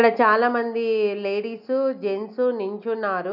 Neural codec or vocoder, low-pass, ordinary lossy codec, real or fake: none; 5.4 kHz; none; real